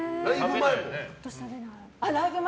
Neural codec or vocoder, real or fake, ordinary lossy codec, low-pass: none; real; none; none